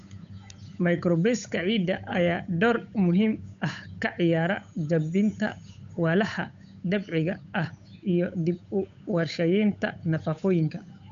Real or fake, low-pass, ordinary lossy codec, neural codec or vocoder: fake; 7.2 kHz; MP3, 64 kbps; codec, 16 kHz, 8 kbps, FunCodec, trained on Chinese and English, 25 frames a second